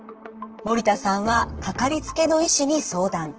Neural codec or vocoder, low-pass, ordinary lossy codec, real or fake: vocoder, 44.1 kHz, 128 mel bands, Pupu-Vocoder; 7.2 kHz; Opus, 16 kbps; fake